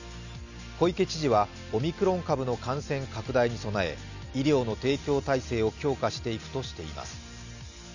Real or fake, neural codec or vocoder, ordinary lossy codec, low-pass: real; none; none; 7.2 kHz